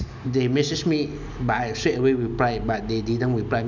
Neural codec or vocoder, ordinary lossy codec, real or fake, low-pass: none; none; real; 7.2 kHz